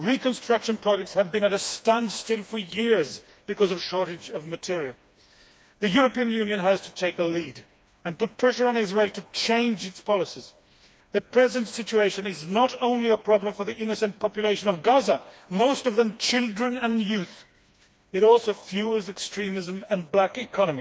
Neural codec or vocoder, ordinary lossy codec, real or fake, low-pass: codec, 16 kHz, 2 kbps, FreqCodec, smaller model; none; fake; none